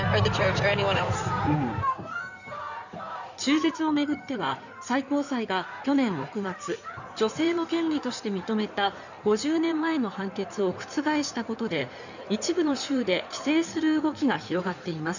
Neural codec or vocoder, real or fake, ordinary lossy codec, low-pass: codec, 16 kHz in and 24 kHz out, 2.2 kbps, FireRedTTS-2 codec; fake; none; 7.2 kHz